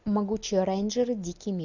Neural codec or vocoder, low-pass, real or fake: none; 7.2 kHz; real